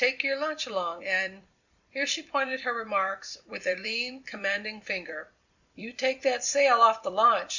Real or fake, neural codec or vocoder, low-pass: fake; vocoder, 44.1 kHz, 128 mel bands every 256 samples, BigVGAN v2; 7.2 kHz